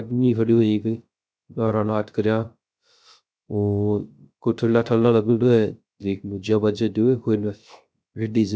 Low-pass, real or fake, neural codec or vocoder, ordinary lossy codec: none; fake; codec, 16 kHz, 0.3 kbps, FocalCodec; none